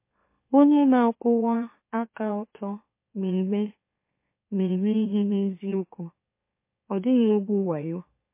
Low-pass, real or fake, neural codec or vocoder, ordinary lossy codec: 3.6 kHz; fake; autoencoder, 44.1 kHz, a latent of 192 numbers a frame, MeloTTS; MP3, 24 kbps